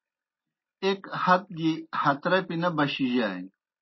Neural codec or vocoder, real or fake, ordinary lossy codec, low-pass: none; real; MP3, 24 kbps; 7.2 kHz